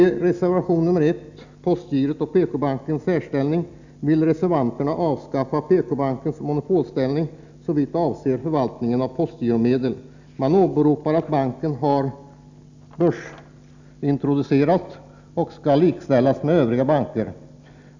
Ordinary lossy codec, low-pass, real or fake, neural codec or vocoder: none; 7.2 kHz; real; none